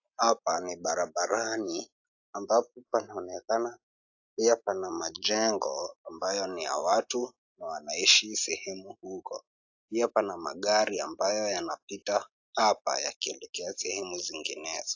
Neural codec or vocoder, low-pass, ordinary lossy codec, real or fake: none; 7.2 kHz; AAC, 48 kbps; real